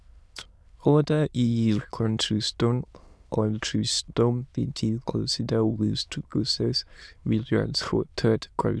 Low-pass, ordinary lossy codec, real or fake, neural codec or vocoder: none; none; fake; autoencoder, 22.05 kHz, a latent of 192 numbers a frame, VITS, trained on many speakers